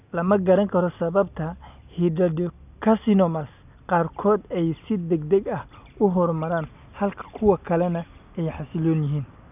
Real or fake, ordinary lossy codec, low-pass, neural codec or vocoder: real; none; 3.6 kHz; none